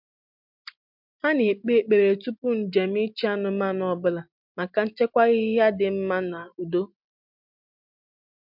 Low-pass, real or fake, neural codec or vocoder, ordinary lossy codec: 5.4 kHz; real; none; MP3, 48 kbps